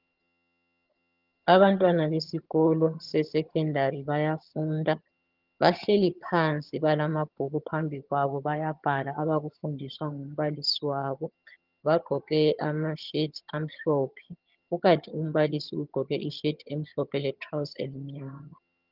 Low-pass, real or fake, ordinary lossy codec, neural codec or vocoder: 5.4 kHz; fake; Opus, 32 kbps; vocoder, 22.05 kHz, 80 mel bands, HiFi-GAN